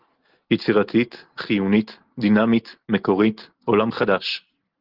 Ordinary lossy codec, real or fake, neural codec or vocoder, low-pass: Opus, 16 kbps; real; none; 5.4 kHz